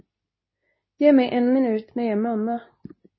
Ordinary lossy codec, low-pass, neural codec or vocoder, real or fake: MP3, 24 kbps; 7.2 kHz; codec, 24 kHz, 0.9 kbps, WavTokenizer, medium speech release version 2; fake